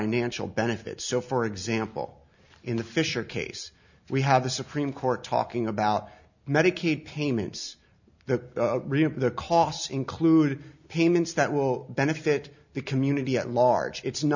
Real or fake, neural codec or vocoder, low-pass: real; none; 7.2 kHz